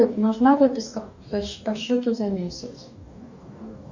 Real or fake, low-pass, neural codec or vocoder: fake; 7.2 kHz; codec, 44.1 kHz, 2.6 kbps, DAC